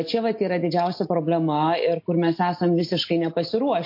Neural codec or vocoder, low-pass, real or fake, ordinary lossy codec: none; 5.4 kHz; real; MP3, 32 kbps